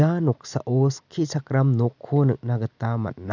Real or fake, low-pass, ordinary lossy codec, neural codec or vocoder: real; 7.2 kHz; none; none